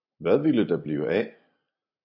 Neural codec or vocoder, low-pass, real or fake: none; 5.4 kHz; real